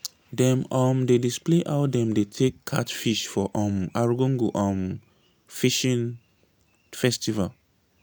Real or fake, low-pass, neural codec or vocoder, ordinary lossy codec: real; none; none; none